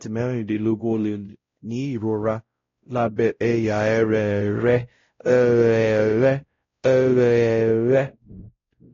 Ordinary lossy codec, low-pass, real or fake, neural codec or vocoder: AAC, 32 kbps; 7.2 kHz; fake; codec, 16 kHz, 0.5 kbps, X-Codec, WavLM features, trained on Multilingual LibriSpeech